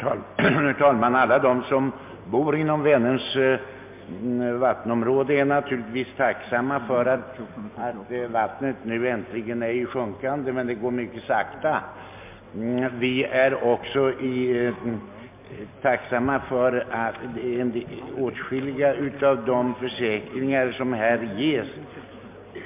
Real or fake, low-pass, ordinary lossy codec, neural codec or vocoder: real; 3.6 kHz; MP3, 32 kbps; none